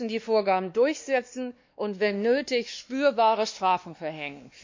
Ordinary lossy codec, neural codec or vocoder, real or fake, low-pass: MP3, 48 kbps; codec, 16 kHz, 2 kbps, X-Codec, WavLM features, trained on Multilingual LibriSpeech; fake; 7.2 kHz